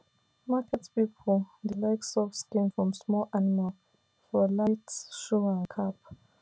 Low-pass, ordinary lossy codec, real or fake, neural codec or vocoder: none; none; real; none